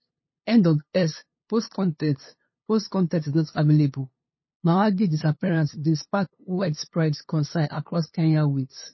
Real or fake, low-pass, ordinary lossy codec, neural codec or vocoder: fake; 7.2 kHz; MP3, 24 kbps; codec, 16 kHz, 2 kbps, FunCodec, trained on LibriTTS, 25 frames a second